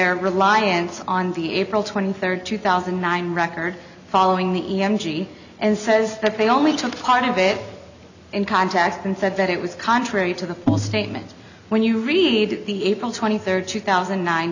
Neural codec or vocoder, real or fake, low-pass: none; real; 7.2 kHz